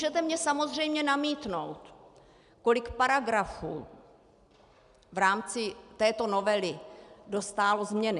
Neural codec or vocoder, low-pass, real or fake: none; 10.8 kHz; real